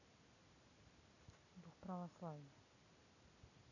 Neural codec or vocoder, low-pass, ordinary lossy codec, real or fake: none; 7.2 kHz; none; real